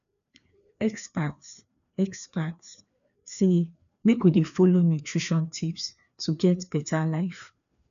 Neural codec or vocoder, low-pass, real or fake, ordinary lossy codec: codec, 16 kHz, 2 kbps, FreqCodec, larger model; 7.2 kHz; fake; none